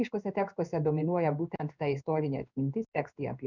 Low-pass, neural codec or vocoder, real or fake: 7.2 kHz; codec, 16 kHz in and 24 kHz out, 1 kbps, XY-Tokenizer; fake